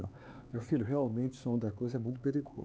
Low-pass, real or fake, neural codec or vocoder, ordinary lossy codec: none; fake; codec, 16 kHz, 4 kbps, X-Codec, HuBERT features, trained on LibriSpeech; none